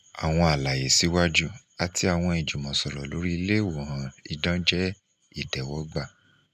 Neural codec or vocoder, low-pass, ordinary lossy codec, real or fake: none; 14.4 kHz; none; real